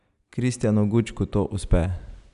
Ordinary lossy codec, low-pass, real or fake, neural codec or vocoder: none; 10.8 kHz; real; none